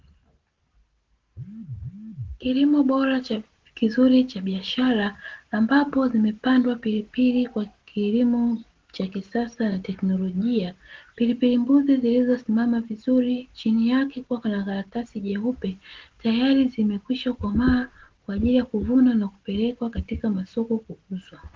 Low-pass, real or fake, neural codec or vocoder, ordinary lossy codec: 7.2 kHz; real; none; Opus, 16 kbps